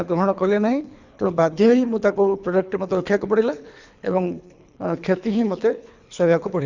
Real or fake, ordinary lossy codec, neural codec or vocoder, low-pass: fake; Opus, 64 kbps; codec, 24 kHz, 3 kbps, HILCodec; 7.2 kHz